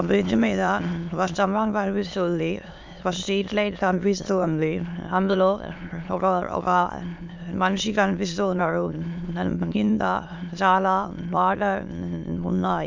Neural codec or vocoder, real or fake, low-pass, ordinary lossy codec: autoencoder, 22.05 kHz, a latent of 192 numbers a frame, VITS, trained on many speakers; fake; 7.2 kHz; MP3, 64 kbps